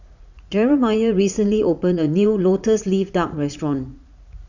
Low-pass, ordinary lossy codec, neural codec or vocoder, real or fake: 7.2 kHz; none; vocoder, 44.1 kHz, 80 mel bands, Vocos; fake